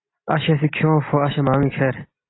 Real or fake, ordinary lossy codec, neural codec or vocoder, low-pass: real; AAC, 16 kbps; none; 7.2 kHz